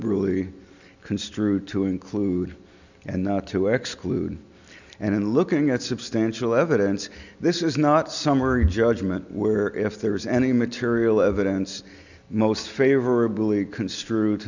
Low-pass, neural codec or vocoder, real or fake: 7.2 kHz; none; real